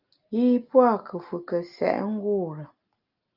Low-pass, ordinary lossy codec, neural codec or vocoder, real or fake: 5.4 kHz; Opus, 24 kbps; none; real